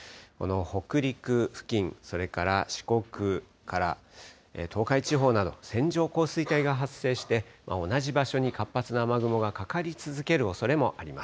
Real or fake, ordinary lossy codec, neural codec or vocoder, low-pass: real; none; none; none